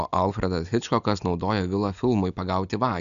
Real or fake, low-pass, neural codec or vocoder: real; 7.2 kHz; none